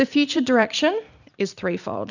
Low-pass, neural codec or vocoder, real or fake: 7.2 kHz; vocoder, 44.1 kHz, 128 mel bands every 256 samples, BigVGAN v2; fake